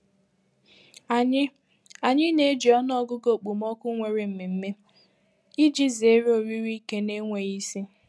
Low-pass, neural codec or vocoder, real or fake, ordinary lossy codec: none; none; real; none